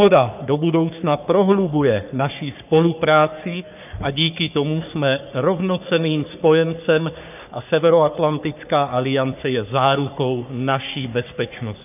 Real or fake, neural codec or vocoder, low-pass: fake; codec, 44.1 kHz, 3.4 kbps, Pupu-Codec; 3.6 kHz